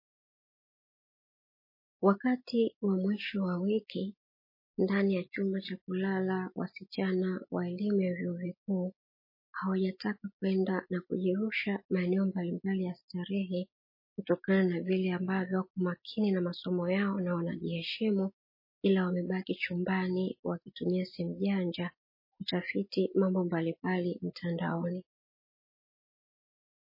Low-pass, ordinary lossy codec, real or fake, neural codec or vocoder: 5.4 kHz; MP3, 24 kbps; real; none